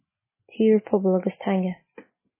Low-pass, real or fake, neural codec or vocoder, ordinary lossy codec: 3.6 kHz; real; none; MP3, 16 kbps